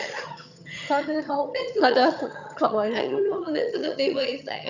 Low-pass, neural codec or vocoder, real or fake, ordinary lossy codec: 7.2 kHz; vocoder, 22.05 kHz, 80 mel bands, HiFi-GAN; fake; none